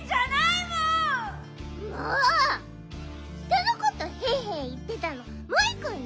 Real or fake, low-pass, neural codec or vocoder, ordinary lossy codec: real; none; none; none